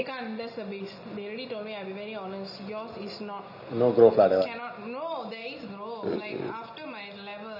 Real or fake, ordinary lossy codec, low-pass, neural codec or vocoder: real; none; 5.4 kHz; none